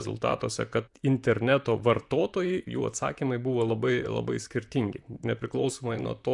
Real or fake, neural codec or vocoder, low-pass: fake; vocoder, 44.1 kHz, 128 mel bands, Pupu-Vocoder; 10.8 kHz